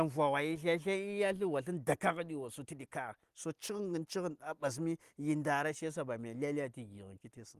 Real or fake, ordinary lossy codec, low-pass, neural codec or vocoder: real; Opus, 24 kbps; 14.4 kHz; none